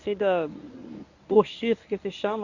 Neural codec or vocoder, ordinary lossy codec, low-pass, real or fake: codec, 24 kHz, 0.9 kbps, WavTokenizer, medium speech release version 1; none; 7.2 kHz; fake